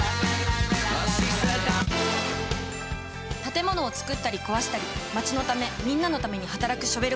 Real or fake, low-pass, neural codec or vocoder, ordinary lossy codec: real; none; none; none